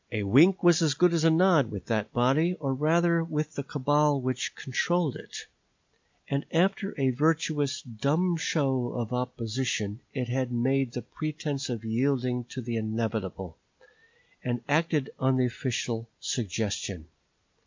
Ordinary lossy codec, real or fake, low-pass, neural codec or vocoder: MP3, 64 kbps; real; 7.2 kHz; none